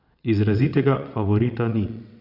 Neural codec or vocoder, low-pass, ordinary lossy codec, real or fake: vocoder, 22.05 kHz, 80 mel bands, WaveNeXt; 5.4 kHz; none; fake